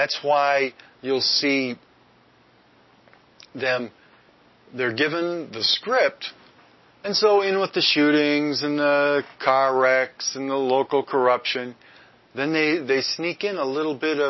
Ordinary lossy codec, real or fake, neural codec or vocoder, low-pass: MP3, 24 kbps; real; none; 7.2 kHz